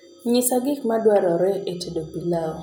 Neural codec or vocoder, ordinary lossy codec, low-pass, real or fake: none; none; none; real